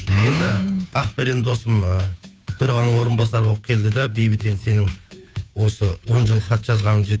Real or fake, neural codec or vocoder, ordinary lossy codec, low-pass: fake; codec, 16 kHz, 2 kbps, FunCodec, trained on Chinese and English, 25 frames a second; none; none